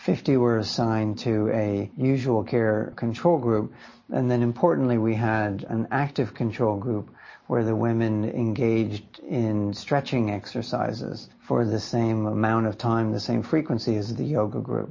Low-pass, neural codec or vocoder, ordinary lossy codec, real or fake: 7.2 kHz; none; MP3, 32 kbps; real